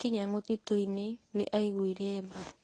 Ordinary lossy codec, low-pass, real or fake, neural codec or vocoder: AAC, 32 kbps; 9.9 kHz; fake; codec, 24 kHz, 0.9 kbps, WavTokenizer, medium speech release version 1